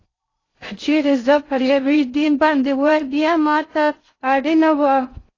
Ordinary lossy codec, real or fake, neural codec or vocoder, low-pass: AAC, 32 kbps; fake; codec, 16 kHz in and 24 kHz out, 0.6 kbps, FocalCodec, streaming, 2048 codes; 7.2 kHz